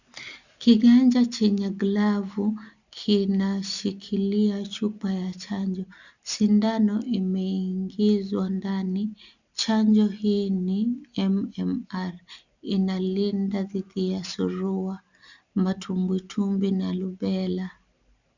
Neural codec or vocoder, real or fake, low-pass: none; real; 7.2 kHz